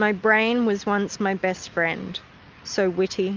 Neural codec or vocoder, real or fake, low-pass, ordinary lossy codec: none; real; 7.2 kHz; Opus, 24 kbps